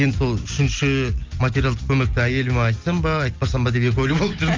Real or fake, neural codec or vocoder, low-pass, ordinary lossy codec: real; none; 7.2 kHz; Opus, 32 kbps